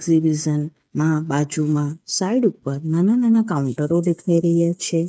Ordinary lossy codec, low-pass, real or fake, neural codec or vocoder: none; none; fake; codec, 16 kHz, 8 kbps, FreqCodec, smaller model